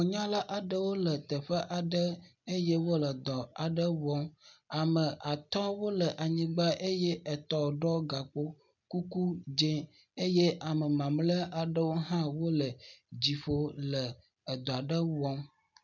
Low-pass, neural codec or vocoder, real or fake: 7.2 kHz; none; real